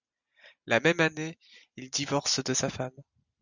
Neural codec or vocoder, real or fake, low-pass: none; real; 7.2 kHz